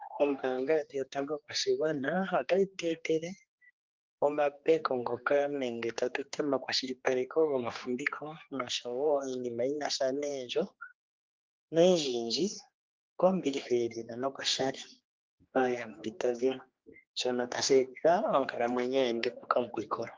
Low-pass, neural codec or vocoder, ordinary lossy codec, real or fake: 7.2 kHz; codec, 16 kHz, 2 kbps, X-Codec, HuBERT features, trained on general audio; Opus, 32 kbps; fake